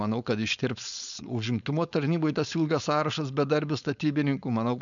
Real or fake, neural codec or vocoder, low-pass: fake; codec, 16 kHz, 4.8 kbps, FACodec; 7.2 kHz